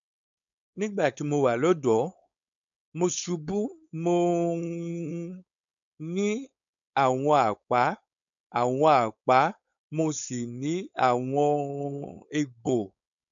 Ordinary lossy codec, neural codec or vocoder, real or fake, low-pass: none; codec, 16 kHz, 4.8 kbps, FACodec; fake; 7.2 kHz